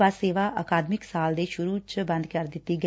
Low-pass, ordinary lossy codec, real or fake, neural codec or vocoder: none; none; real; none